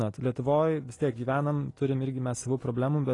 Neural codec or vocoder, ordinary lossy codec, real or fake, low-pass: none; AAC, 32 kbps; real; 10.8 kHz